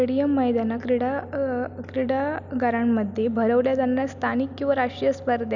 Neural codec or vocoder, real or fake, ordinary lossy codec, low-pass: none; real; none; 7.2 kHz